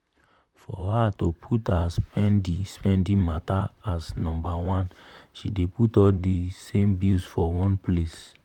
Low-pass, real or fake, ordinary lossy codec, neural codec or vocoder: 19.8 kHz; fake; none; vocoder, 44.1 kHz, 128 mel bands, Pupu-Vocoder